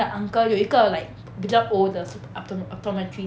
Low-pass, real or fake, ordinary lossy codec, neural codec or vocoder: none; real; none; none